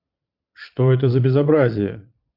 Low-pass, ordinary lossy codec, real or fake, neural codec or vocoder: 5.4 kHz; MP3, 48 kbps; fake; vocoder, 22.05 kHz, 80 mel bands, Vocos